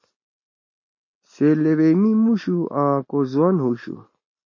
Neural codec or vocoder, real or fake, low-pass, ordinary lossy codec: none; real; 7.2 kHz; MP3, 32 kbps